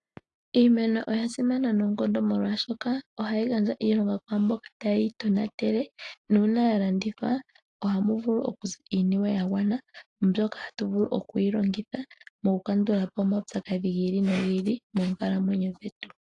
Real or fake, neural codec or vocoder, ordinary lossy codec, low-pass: real; none; AAC, 48 kbps; 10.8 kHz